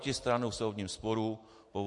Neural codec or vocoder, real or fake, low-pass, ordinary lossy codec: none; real; 10.8 kHz; MP3, 48 kbps